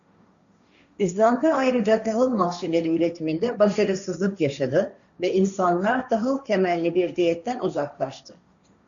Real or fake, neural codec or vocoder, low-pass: fake; codec, 16 kHz, 1.1 kbps, Voila-Tokenizer; 7.2 kHz